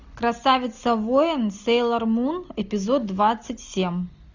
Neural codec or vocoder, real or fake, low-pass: none; real; 7.2 kHz